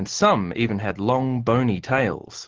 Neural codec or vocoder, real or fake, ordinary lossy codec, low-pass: none; real; Opus, 16 kbps; 7.2 kHz